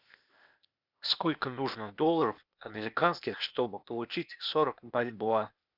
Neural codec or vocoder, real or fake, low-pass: codec, 16 kHz, 0.8 kbps, ZipCodec; fake; 5.4 kHz